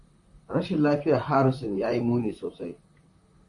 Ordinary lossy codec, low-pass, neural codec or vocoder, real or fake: MP3, 64 kbps; 10.8 kHz; vocoder, 44.1 kHz, 128 mel bands, Pupu-Vocoder; fake